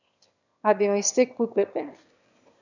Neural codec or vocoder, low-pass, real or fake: autoencoder, 22.05 kHz, a latent of 192 numbers a frame, VITS, trained on one speaker; 7.2 kHz; fake